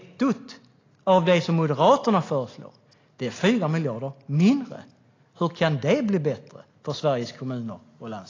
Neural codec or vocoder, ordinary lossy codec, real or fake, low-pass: none; AAC, 32 kbps; real; 7.2 kHz